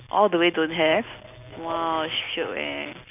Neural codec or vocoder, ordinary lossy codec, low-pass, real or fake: none; none; 3.6 kHz; real